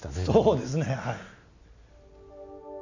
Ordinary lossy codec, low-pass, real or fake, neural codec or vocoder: none; 7.2 kHz; real; none